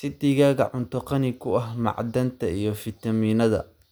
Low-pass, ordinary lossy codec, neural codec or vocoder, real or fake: none; none; none; real